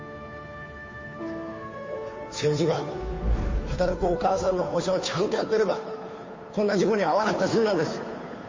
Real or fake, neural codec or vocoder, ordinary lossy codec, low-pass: fake; codec, 16 kHz, 2 kbps, FunCodec, trained on Chinese and English, 25 frames a second; MP3, 32 kbps; 7.2 kHz